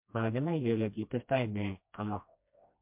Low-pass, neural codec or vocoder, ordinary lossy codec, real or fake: 3.6 kHz; codec, 16 kHz, 1 kbps, FreqCodec, smaller model; MP3, 32 kbps; fake